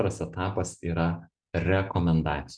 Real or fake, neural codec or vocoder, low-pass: real; none; 9.9 kHz